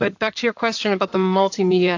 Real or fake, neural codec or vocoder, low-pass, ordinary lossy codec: fake; codec, 16 kHz in and 24 kHz out, 2.2 kbps, FireRedTTS-2 codec; 7.2 kHz; AAC, 48 kbps